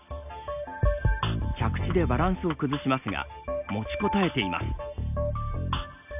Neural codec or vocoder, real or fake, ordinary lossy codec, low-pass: none; real; none; 3.6 kHz